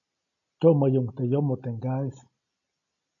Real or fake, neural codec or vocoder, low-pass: real; none; 7.2 kHz